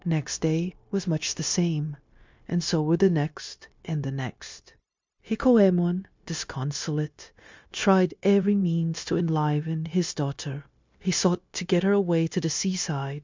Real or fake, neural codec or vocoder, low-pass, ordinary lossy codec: fake; codec, 16 kHz, 0.9 kbps, LongCat-Audio-Codec; 7.2 kHz; MP3, 64 kbps